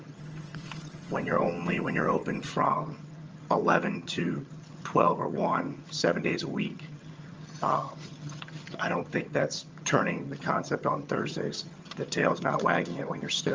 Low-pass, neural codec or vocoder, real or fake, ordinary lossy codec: 7.2 kHz; vocoder, 22.05 kHz, 80 mel bands, HiFi-GAN; fake; Opus, 24 kbps